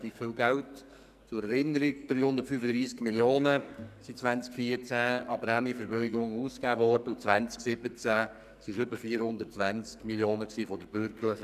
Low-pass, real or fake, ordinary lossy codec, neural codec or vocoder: 14.4 kHz; fake; none; codec, 44.1 kHz, 2.6 kbps, SNAC